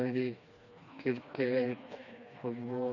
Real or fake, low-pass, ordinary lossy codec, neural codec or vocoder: fake; 7.2 kHz; none; codec, 16 kHz, 2 kbps, FreqCodec, smaller model